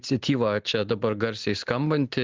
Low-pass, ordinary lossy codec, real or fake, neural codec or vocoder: 7.2 kHz; Opus, 16 kbps; real; none